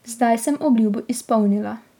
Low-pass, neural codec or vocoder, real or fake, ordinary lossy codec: 19.8 kHz; none; real; none